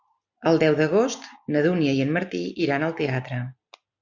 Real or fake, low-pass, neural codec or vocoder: real; 7.2 kHz; none